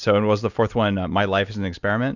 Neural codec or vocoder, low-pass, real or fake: none; 7.2 kHz; real